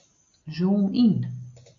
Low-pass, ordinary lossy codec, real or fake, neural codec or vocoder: 7.2 kHz; MP3, 48 kbps; real; none